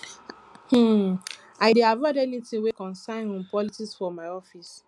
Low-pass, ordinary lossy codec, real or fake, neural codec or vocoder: none; none; real; none